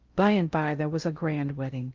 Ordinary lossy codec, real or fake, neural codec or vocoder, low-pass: Opus, 24 kbps; fake; codec, 16 kHz in and 24 kHz out, 0.6 kbps, FocalCodec, streaming, 2048 codes; 7.2 kHz